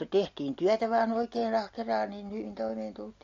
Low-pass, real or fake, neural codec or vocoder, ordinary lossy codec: 7.2 kHz; real; none; AAC, 48 kbps